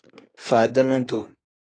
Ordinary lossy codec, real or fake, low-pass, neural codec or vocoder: AAC, 32 kbps; fake; 9.9 kHz; codec, 24 kHz, 1 kbps, SNAC